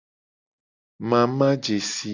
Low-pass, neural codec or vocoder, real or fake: 7.2 kHz; none; real